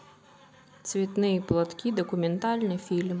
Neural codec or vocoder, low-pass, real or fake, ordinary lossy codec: none; none; real; none